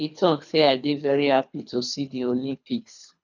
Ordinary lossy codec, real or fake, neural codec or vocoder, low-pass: none; fake; codec, 24 kHz, 3 kbps, HILCodec; 7.2 kHz